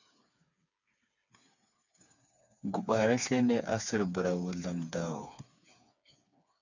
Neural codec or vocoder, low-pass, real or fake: codec, 16 kHz, 4 kbps, FreqCodec, smaller model; 7.2 kHz; fake